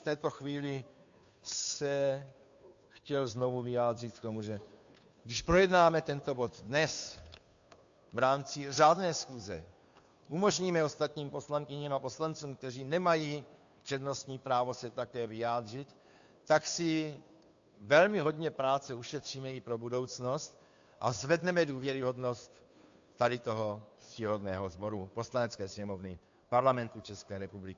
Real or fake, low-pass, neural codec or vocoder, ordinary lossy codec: fake; 7.2 kHz; codec, 16 kHz, 2 kbps, FunCodec, trained on Chinese and English, 25 frames a second; AAC, 64 kbps